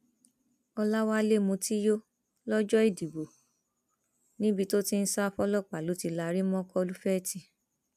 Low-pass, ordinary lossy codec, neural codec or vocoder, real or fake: 14.4 kHz; none; none; real